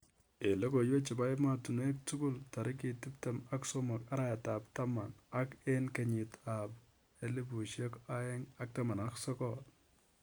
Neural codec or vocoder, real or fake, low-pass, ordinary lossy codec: none; real; none; none